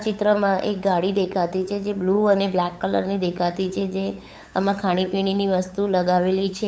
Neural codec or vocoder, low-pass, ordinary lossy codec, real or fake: codec, 16 kHz, 8 kbps, FunCodec, trained on LibriTTS, 25 frames a second; none; none; fake